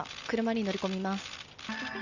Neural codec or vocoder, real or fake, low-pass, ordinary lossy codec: none; real; 7.2 kHz; none